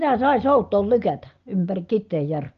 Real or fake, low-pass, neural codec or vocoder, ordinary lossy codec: real; 7.2 kHz; none; Opus, 24 kbps